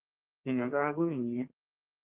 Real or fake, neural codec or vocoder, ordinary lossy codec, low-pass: fake; codec, 32 kHz, 1.9 kbps, SNAC; Opus, 32 kbps; 3.6 kHz